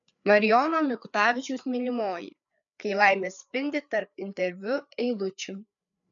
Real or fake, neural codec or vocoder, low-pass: fake; codec, 16 kHz, 4 kbps, FreqCodec, larger model; 7.2 kHz